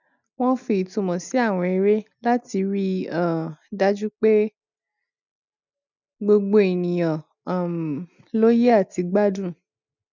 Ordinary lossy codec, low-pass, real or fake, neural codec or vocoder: none; 7.2 kHz; real; none